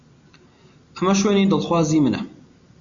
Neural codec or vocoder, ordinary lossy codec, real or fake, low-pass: none; Opus, 64 kbps; real; 7.2 kHz